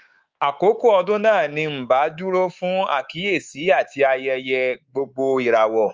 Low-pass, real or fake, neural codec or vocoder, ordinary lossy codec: 7.2 kHz; fake; codec, 24 kHz, 3.1 kbps, DualCodec; Opus, 32 kbps